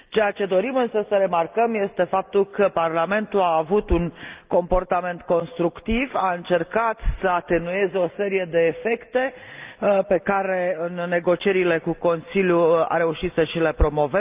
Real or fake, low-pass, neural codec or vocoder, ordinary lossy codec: real; 3.6 kHz; none; Opus, 24 kbps